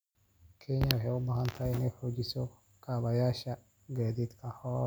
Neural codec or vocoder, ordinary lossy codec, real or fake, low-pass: none; none; real; none